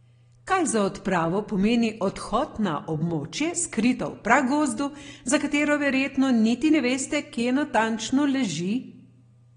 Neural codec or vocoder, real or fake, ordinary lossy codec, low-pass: none; real; AAC, 32 kbps; 9.9 kHz